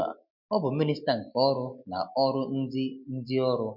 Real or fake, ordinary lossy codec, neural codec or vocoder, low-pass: real; MP3, 48 kbps; none; 5.4 kHz